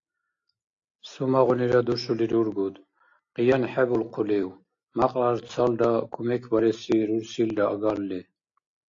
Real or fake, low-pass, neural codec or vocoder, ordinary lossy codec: real; 7.2 kHz; none; AAC, 48 kbps